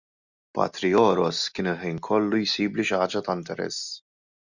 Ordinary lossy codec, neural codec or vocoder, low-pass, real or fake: Opus, 64 kbps; none; 7.2 kHz; real